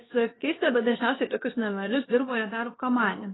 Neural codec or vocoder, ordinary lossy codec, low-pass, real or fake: codec, 16 kHz, about 1 kbps, DyCAST, with the encoder's durations; AAC, 16 kbps; 7.2 kHz; fake